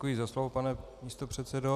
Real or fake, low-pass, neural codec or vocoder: real; 14.4 kHz; none